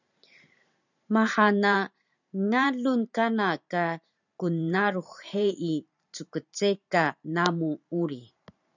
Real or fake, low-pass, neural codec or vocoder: fake; 7.2 kHz; vocoder, 44.1 kHz, 128 mel bands every 512 samples, BigVGAN v2